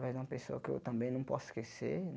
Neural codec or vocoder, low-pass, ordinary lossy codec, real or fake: none; none; none; real